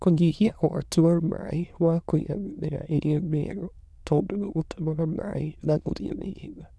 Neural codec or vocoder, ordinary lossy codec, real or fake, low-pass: autoencoder, 22.05 kHz, a latent of 192 numbers a frame, VITS, trained on many speakers; none; fake; none